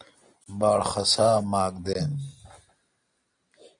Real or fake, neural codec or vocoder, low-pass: real; none; 9.9 kHz